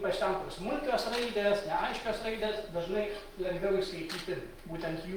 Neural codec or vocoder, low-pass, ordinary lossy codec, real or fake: none; 19.8 kHz; Opus, 32 kbps; real